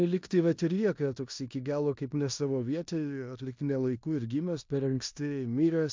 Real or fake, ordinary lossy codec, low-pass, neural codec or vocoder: fake; MP3, 64 kbps; 7.2 kHz; codec, 16 kHz in and 24 kHz out, 0.9 kbps, LongCat-Audio-Codec, four codebook decoder